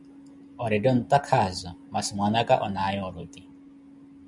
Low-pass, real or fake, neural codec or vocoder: 10.8 kHz; real; none